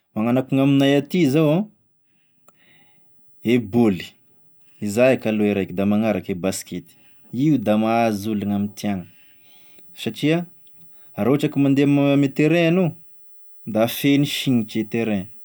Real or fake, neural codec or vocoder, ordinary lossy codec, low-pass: real; none; none; none